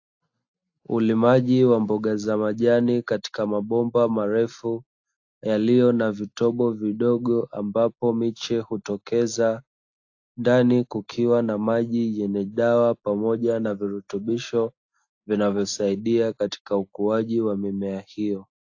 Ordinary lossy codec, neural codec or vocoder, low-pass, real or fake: AAC, 48 kbps; none; 7.2 kHz; real